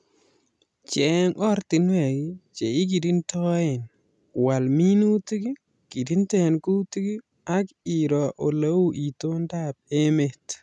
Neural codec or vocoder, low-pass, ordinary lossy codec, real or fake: none; none; none; real